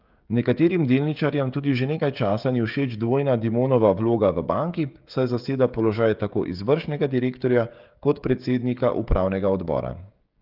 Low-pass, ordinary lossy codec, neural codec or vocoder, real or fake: 5.4 kHz; Opus, 32 kbps; codec, 16 kHz, 16 kbps, FreqCodec, smaller model; fake